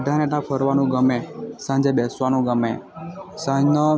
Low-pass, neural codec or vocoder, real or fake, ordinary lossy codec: none; none; real; none